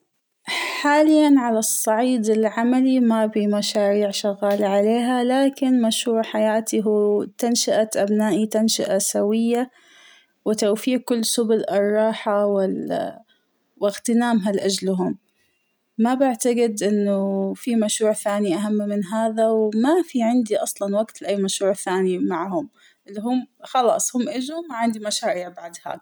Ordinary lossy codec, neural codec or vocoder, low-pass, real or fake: none; none; none; real